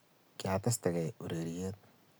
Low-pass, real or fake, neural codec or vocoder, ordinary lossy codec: none; real; none; none